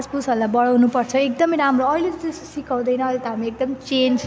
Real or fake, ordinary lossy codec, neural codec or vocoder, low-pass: real; none; none; none